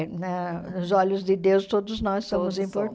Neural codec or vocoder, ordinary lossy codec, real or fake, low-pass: none; none; real; none